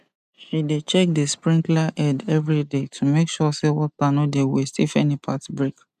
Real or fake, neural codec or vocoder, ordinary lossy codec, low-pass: real; none; none; 14.4 kHz